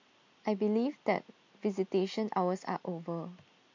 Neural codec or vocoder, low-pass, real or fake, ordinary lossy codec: none; 7.2 kHz; real; MP3, 48 kbps